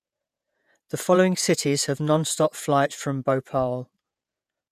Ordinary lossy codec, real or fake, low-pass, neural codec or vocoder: none; fake; 14.4 kHz; vocoder, 48 kHz, 128 mel bands, Vocos